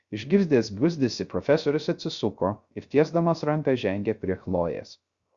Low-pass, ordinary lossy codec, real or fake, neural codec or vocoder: 7.2 kHz; Opus, 64 kbps; fake; codec, 16 kHz, 0.3 kbps, FocalCodec